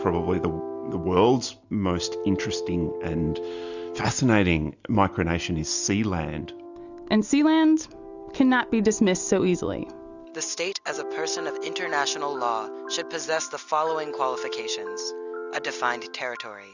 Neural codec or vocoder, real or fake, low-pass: none; real; 7.2 kHz